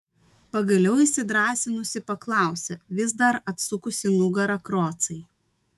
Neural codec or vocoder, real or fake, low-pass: autoencoder, 48 kHz, 128 numbers a frame, DAC-VAE, trained on Japanese speech; fake; 14.4 kHz